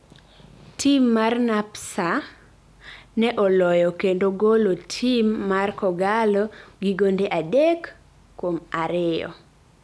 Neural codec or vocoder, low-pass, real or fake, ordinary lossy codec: none; none; real; none